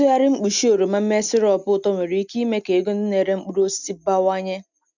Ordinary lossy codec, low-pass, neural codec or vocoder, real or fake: none; 7.2 kHz; none; real